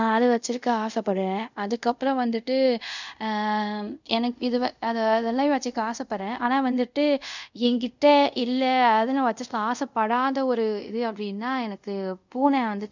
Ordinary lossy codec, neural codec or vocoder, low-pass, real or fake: none; codec, 24 kHz, 0.5 kbps, DualCodec; 7.2 kHz; fake